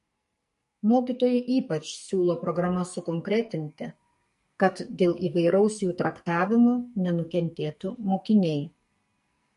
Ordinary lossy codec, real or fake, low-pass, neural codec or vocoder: MP3, 48 kbps; fake; 14.4 kHz; codec, 44.1 kHz, 2.6 kbps, SNAC